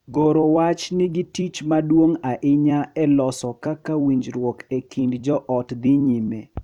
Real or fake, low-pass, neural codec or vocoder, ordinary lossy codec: fake; 19.8 kHz; vocoder, 44.1 kHz, 128 mel bands every 256 samples, BigVGAN v2; none